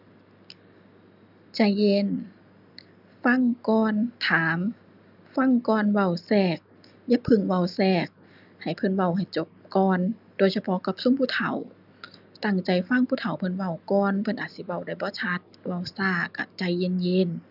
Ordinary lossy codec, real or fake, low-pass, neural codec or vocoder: none; real; 5.4 kHz; none